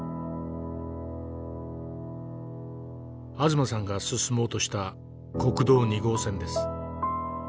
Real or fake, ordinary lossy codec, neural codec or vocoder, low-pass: real; none; none; none